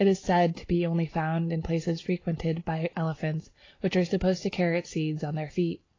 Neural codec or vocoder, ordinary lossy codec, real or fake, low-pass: none; AAC, 32 kbps; real; 7.2 kHz